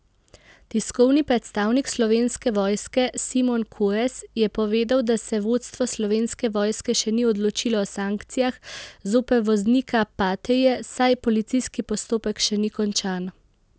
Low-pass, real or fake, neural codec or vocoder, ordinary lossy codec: none; real; none; none